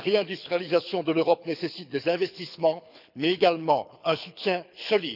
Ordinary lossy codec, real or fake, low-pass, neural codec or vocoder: none; fake; 5.4 kHz; codec, 24 kHz, 6 kbps, HILCodec